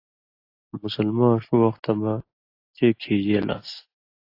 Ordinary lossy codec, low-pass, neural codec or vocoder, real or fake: AAC, 32 kbps; 5.4 kHz; none; real